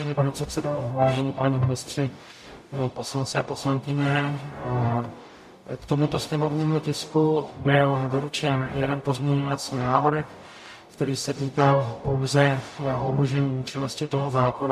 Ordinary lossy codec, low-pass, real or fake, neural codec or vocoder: MP3, 64 kbps; 14.4 kHz; fake; codec, 44.1 kHz, 0.9 kbps, DAC